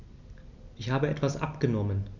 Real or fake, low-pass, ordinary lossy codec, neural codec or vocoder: real; 7.2 kHz; none; none